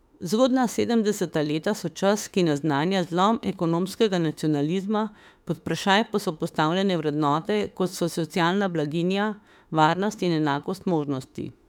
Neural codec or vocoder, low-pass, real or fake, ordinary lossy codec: autoencoder, 48 kHz, 32 numbers a frame, DAC-VAE, trained on Japanese speech; 19.8 kHz; fake; none